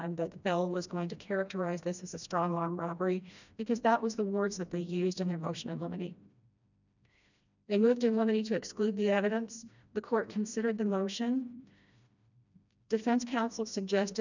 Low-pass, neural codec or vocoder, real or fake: 7.2 kHz; codec, 16 kHz, 1 kbps, FreqCodec, smaller model; fake